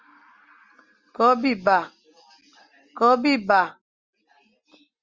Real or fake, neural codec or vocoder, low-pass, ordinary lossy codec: real; none; 7.2 kHz; Opus, 32 kbps